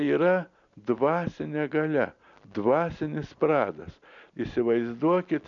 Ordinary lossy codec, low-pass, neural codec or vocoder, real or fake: MP3, 96 kbps; 7.2 kHz; none; real